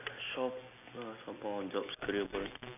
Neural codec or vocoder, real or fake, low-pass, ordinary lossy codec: none; real; 3.6 kHz; none